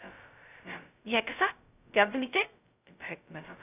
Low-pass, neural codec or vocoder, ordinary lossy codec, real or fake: 3.6 kHz; codec, 16 kHz, 0.2 kbps, FocalCodec; none; fake